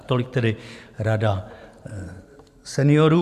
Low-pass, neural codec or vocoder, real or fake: 14.4 kHz; vocoder, 44.1 kHz, 128 mel bands every 512 samples, BigVGAN v2; fake